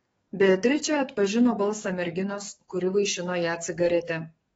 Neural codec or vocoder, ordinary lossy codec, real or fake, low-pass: codec, 44.1 kHz, 7.8 kbps, DAC; AAC, 24 kbps; fake; 19.8 kHz